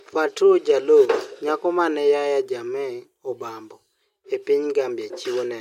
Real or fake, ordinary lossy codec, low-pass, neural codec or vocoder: real; MP3, 64 kbps; 19.8 kHz; none